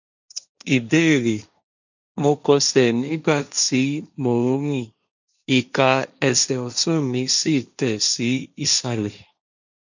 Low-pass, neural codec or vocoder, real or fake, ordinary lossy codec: 7.2 kHz; codec, 16 kHz, 1.1 kbps, Voila-Tokenizer; fake; none